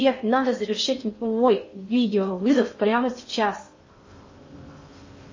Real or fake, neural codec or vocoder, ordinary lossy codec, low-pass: fake; codec, 16 kHz in and 24 kHz out, 0.6 kbps, FocalCodec, streaming, 4096 codes; MP3, 32 kbps; 7.2 kHz